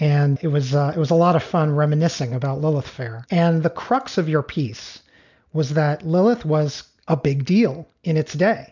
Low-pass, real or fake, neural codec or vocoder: 7.2 kHz; real; none